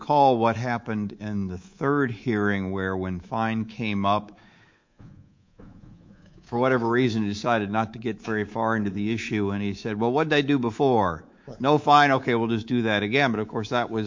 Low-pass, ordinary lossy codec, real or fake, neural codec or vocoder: 7.2 kHz; MP3, 48 kbps; fake; codec, 24 kHz, 3.1 kbps, DualCodec